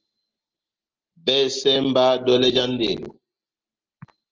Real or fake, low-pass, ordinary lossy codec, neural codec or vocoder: real; 7.2 kHz; Opus, 16 kbps; none